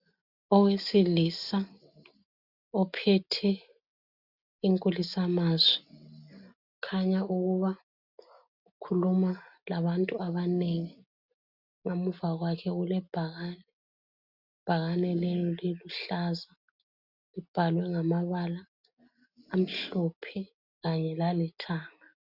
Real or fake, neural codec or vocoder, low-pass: real; none; 5.4 kHz